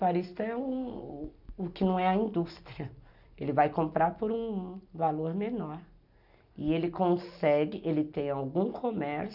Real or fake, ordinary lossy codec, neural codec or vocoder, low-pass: real; none; none; 5.4 kHz